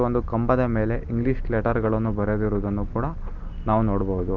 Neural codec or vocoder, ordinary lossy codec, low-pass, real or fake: none; Opus, 24 kbps; 7.2 kHz; real